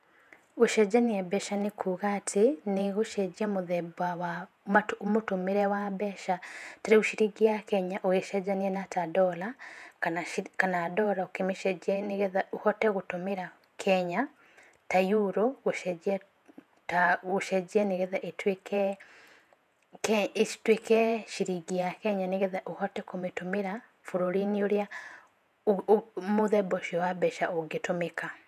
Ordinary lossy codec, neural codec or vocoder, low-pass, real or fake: none; vocoder, 48 kHz, 128 mel bands, Vocos; 14.4 kHz; fake